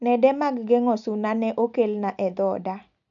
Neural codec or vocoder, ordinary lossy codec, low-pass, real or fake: none; none; 7.2 kHz; real